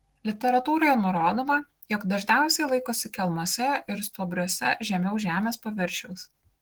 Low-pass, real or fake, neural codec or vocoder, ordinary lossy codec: 19.8 kHz; fake; autoencoder, 48 kHz, 128 numbers a frame, DAC-VAE, trained on Japanese speech; Opus, 16 kbps